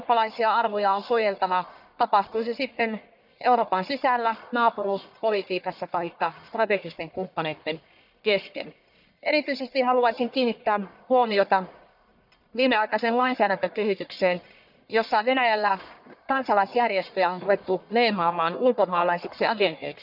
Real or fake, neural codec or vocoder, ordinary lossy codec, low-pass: fake; codec, 44.1 kHz, 1.7 kbps, Pupu-Codec; none; 5.4 kHz